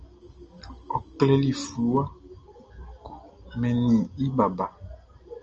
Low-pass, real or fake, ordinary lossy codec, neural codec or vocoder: 7.2 kHz; real; Opus, 24 kbps; none